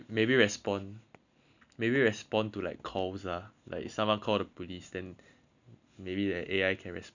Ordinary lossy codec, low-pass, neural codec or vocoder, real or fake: none; 7.2 kHz; none; real